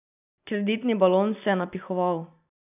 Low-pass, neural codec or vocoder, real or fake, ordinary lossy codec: 3.6 kHz; none; real; none